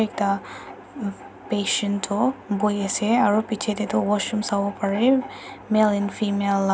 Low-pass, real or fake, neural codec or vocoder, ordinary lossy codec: none; real; none; none